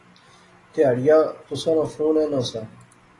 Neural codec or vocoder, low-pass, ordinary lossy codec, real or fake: none; 10.8 kHz; AAC, 32 kbps; real